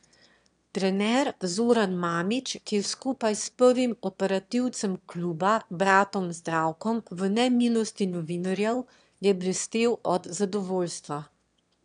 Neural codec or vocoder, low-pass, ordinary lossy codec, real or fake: autoencoder, 22.05 kHz, a latent of 192 numbers a frame, VITS, trained on one speaker; 9.9 kHz; none; fake